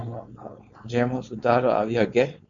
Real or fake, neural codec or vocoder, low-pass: fake; codec, 16 kHz, 4.8 kbps, FACodec; 7.2 kHz